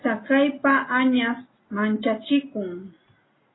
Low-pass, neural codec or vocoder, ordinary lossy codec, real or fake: 7.2 kHz; none; AAC, 16 kbps; real